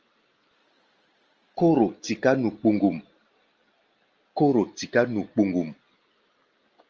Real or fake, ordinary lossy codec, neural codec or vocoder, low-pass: real; Opus, 24 kbps; none; 7.2 kHz